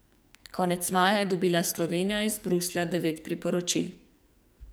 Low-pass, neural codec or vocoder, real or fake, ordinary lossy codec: none; codec, 44.1 kHz, 2.6 kbps, SNAC; fake; none